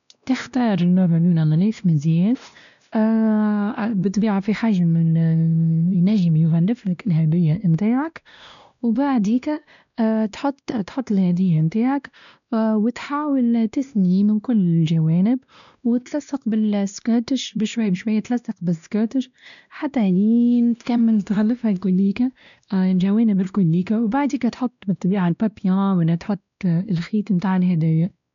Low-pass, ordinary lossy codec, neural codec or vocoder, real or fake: 7.2 kHz; none; codec, 16 kHz, 1 kbps, X-Codec, WavLM features, trained on Multilingual LibriSpeech; fake